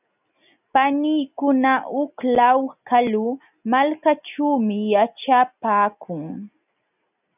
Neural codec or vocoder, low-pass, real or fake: none; 3.6 kHz; real